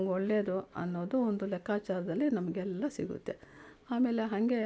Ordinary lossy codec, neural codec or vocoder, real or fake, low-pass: none; none; real; none